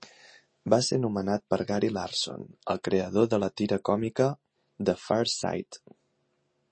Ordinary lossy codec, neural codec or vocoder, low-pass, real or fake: MP3, 32 kbps; none; 9.9 kHz; real